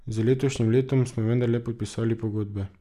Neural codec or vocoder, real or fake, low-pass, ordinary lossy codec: none; real; 14.4 kHz; none